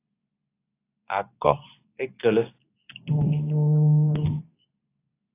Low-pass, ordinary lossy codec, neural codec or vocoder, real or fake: 3.6 kHz; AAC, 24 kbps; codec, 24 kHz, 0.9 kbps, WavTokenizer, medium speech release version 2; fake